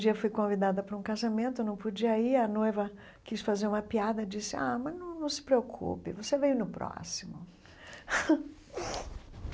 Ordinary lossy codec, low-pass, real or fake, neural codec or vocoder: none; none; real; none